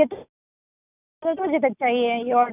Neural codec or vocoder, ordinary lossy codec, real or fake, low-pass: none; none; real; 3.6 kHz